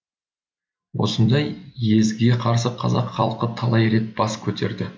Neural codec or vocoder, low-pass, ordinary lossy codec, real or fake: none; none; none; real